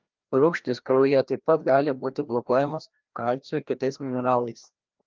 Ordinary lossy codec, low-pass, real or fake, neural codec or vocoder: Opus, 24 kbps; 7.2 kHz; fake; codec, 16 kHz, 1 kbps, FreqCodec, larger model